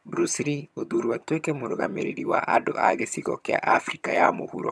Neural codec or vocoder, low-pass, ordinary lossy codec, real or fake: vocoder, 22.05 kHz, 80 mel bands, HiFi-GAN; none; none; fake